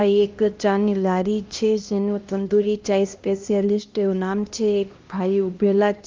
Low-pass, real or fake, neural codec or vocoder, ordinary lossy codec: 7.2 kHz; fake; codec, 16 kHz, 1 kbps, X-Codec, HuBERT features, trained on LibriSpeech; Opus, 24 kbps